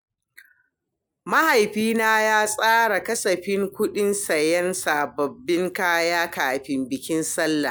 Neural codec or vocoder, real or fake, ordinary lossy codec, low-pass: none; real; none; none